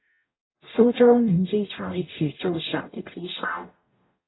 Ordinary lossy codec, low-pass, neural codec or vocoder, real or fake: AAC, 16 kbps; 7.2 kHz; codec, 44.1 kHz, 0.9 kbps, DAC; fake